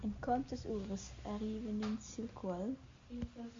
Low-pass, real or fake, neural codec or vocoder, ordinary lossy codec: 7.2 kHz; real; none; MP3, 48 kbps